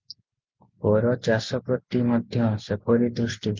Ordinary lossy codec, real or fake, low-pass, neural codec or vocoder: Opus, 24 kbps; real; 7.2 kHz; none